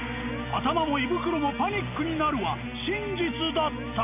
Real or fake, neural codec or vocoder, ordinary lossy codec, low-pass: real; none; none; 3.6 kHz